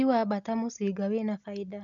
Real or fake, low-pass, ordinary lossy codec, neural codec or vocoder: real; 7.2 kHz; none; none